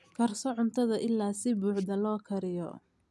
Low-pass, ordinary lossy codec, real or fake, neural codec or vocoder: none; none; real; none